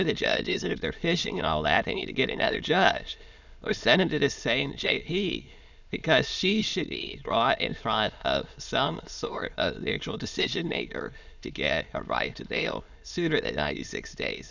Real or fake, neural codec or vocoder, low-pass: fake; autoencoder, 22.05 kHz, a latent of 192 numbers a frame, VITS, trained on many speakers; 7.2 kHz